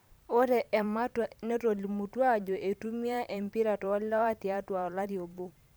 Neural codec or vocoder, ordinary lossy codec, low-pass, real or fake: vocoder, 44.1 kHz, 128 mel bands every 512 samples, BigVGAN v2; none; none; fake